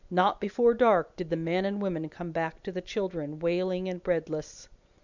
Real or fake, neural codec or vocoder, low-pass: real; none; 7.2 kHz